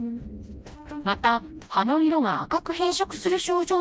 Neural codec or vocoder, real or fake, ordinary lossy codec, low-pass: codec, 16 kHz, 1 kbps, FreqCodec, smaller model; fake; none; none